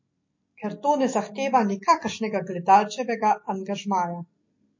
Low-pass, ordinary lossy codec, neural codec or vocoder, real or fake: 7.2 kHz; MP3, 32 kbps; none; real